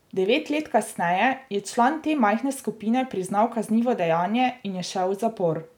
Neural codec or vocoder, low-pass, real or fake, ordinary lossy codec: none; 19.8 kHz; real; none